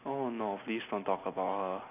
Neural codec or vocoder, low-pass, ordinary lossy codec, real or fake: none; 3.6 kHz; none; real